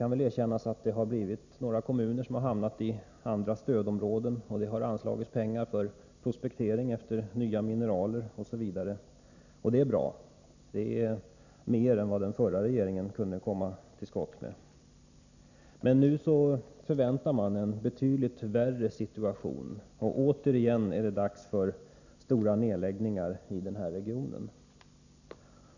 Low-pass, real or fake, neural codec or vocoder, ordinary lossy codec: 7.2 kHz; real; none; none